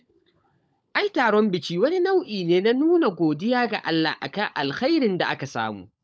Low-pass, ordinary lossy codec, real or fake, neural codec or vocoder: none; none; fake; codec, 16 kHz, 16 kbps, FunCodec, trained on LibriTTS, 50 frames a second